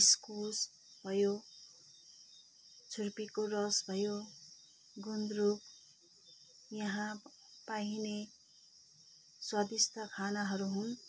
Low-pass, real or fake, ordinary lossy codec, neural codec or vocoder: none; real; none; none